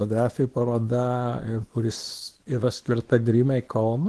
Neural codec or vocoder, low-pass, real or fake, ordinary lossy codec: codec, 24 kHz, 0.9 kbps, WavTokenizer, small release; 10.8 kHz; fake; Opus, 16 kbps